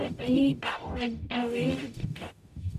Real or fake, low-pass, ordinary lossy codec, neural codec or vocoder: fake; 14.4 kHz; none; codec, 44.1 kHz, 0.9 kbps, DAC